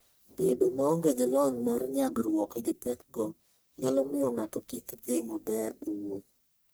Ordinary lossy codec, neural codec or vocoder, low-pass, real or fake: none; codec, 44.1 kHz, 1.7 kbps, Pupu-Codec; none; fake